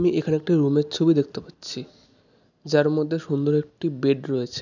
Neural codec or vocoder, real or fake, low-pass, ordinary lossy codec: none; real; 7.2 kHz; none